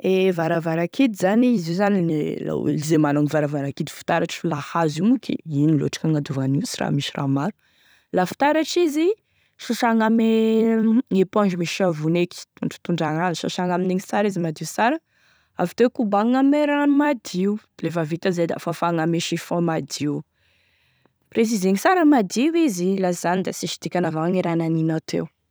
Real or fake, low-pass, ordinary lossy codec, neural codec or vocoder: fake; none; none; vocoder, 44.1 kHz, 128 mel bands every 256 samples, BigVGAN v2